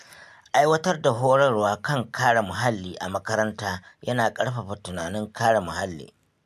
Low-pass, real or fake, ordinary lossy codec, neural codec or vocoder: 14.4 kHz; real; MP3, 96 kbps; none